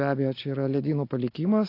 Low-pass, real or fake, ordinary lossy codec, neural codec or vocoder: 5.4 kHz; real; AAC, 32 kbps; none